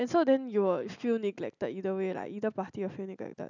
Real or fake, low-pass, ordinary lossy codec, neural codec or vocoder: real; 7.2 kHz; none; none